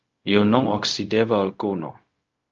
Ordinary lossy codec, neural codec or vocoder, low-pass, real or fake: Opus, 32 kbps; codec, 16 kHz, 0.4 kbps, LongCat-Audio-Codec; 7.2 kHz; fake